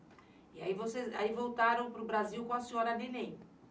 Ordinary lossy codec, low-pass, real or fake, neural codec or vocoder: none; none; real; none